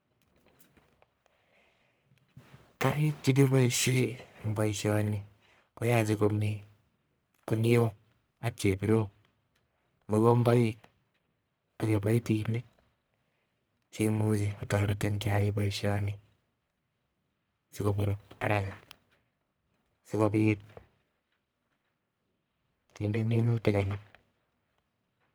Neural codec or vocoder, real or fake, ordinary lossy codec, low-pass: codec, 44.1 kHz, 1.7 kbps, Pupu-Codec; fake; none; none